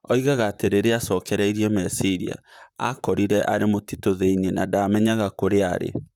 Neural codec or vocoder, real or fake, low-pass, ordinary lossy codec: vocoder, 44.1 kHz, 128 mel bands, Pupu-Vocoder; fake; 19.8 kHz; none